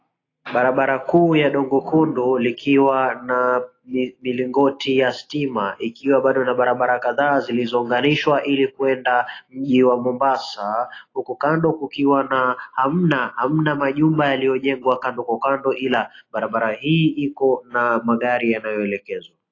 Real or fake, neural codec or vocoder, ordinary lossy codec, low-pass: real; none; AAC, 32 kbps; 7.2 kHz